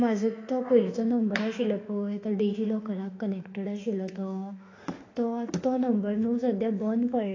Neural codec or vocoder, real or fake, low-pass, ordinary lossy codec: autoencoder, 48 kHz, 32 numbers a frame, DAC-VAE, trained on Japanese speech; fake; 7.2 kHz; AAC, 32 kbps